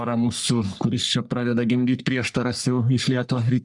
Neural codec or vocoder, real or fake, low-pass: codec, 44.1 kHz, 3.4 kbps, Pupu-Codec; fake; 10.8 kHz